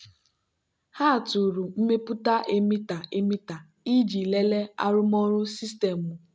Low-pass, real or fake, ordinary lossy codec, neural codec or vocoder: none; real; none; none